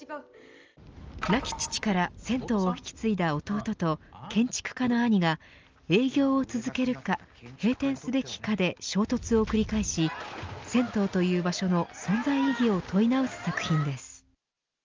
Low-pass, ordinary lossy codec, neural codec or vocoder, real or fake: 7.2 kHz; Opus, 24 kbps; none; real